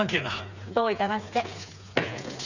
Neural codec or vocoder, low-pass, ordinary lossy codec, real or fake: codec, 16 kHz, 4 kbps, FreqCodec, smaller model; 7.2 kHz; none; fake